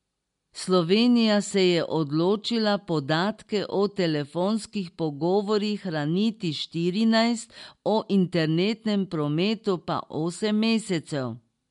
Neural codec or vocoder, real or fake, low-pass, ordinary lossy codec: none; real; 10.8 kHz; MP3, 64 kbps